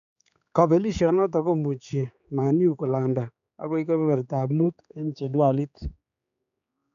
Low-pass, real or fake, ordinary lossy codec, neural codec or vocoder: 7.2 kHz; fake; none; codec, 16 kHz, 4 kbps, X-Codec, HuBERT features, trained on general audio